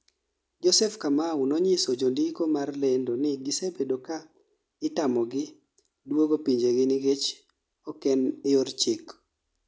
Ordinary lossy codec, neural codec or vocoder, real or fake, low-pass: none; none; real; none